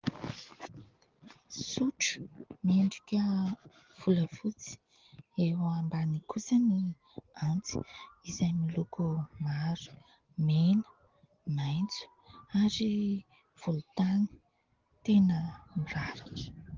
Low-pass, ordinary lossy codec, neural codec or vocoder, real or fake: 7.2 kHz; Opus, 16 kbps; none; real